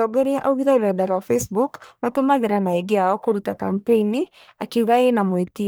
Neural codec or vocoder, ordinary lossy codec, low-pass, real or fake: codec, 44.1 kHz, 1.7 kbps, Pupu-Codec; none; none; fake